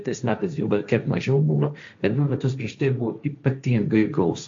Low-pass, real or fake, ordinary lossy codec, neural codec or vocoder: 7.2 kHz; fake; MP3, 48 kbps; codec, 16 kHz, 1.1 kbps, Voila-Tokenizer